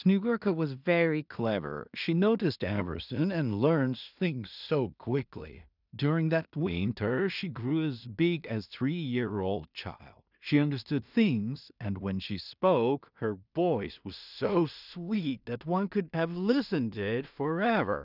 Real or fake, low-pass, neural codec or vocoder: fake; 5.4 kHz; codec, 16 kHz in and 24 kHz out, 0.4 kbps, LongCat-Audio-Codec, two codebook decoder